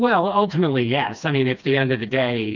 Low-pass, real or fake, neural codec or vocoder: 7.2 kHz; fake; codec, 16 kHz, 2 kbps, FreqCodec, smaller model